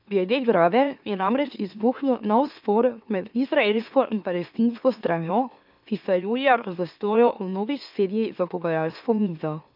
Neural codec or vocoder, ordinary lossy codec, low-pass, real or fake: autoencoder, 44.1 kHz, a latent of 192 numbers a frame, MeloTTS; none; 5.4 kHz; fake